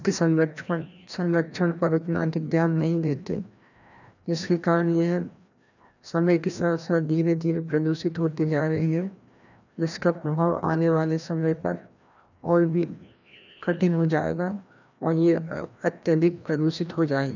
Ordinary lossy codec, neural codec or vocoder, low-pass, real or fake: none; codec, 16 kHz, 1 kbps, FreqCodec, larger model; 7.2 kHz; fake